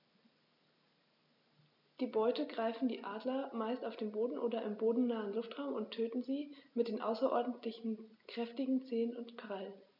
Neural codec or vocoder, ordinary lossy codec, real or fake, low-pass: none; none; real; 5.4 kHz